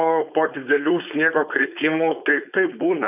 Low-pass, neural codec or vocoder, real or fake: 3.6 kHz; codec, 16 kHz, 4.8 kbps, FACodec; fake